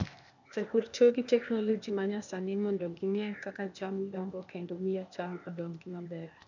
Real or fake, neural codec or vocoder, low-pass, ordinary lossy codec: fake; codec, 16 kHz, 0.8 kbps, ZipCodec; 7.2 kHz; none